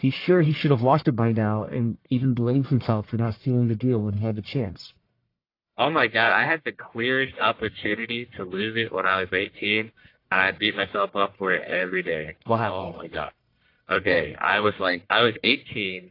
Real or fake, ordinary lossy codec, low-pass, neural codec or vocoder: fake; AAC, 32 kbps; 5.4 kHz; codec, 44.1 kHz, 1.7 kbps, Pupu-Codec